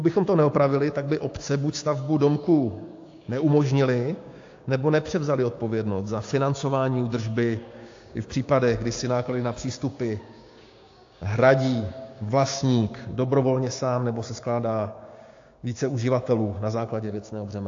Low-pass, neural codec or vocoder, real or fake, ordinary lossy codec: 7.2 kHz; codec, 16 kHz, 6 kbps, DAC; fake; AAC, 48 kbps